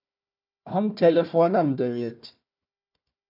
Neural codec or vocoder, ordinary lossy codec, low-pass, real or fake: codec, 16 kHz, 1 kbps, FunCodec, trained on Chinese and English, 50 frames a second; AAC, 48 kbps; 5.4 kHz; fake